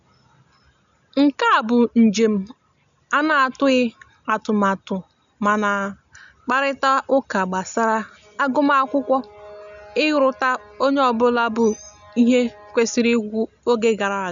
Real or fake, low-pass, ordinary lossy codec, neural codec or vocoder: real; 7.2 kHz; none; none